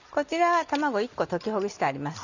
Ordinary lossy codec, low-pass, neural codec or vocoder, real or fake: none; 7.2 kHz; none; real